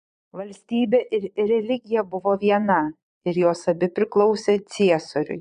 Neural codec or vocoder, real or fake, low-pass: vocoder, 22.05 kHz, 80 mel bands, Vocos; fake; 9.9 kHz